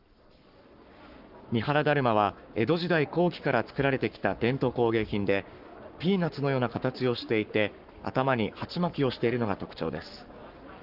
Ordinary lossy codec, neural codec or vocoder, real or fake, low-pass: Opus, 24 kbps; codec, 44.1 kHz, 7.8 kbps, Pupu-Codec; fake; 5.4 kHz